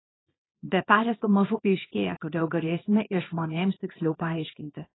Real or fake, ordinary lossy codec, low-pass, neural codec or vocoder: fake; AAC, 16 kbps; 7.2 kHz; codec, 24 kHz, 0.9 kbps, WavTokenizer, small release